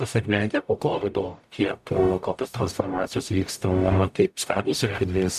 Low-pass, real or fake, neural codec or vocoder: 14.4 kHz; fake; codec, 44.1 kHz, 0.9 kbps, DAC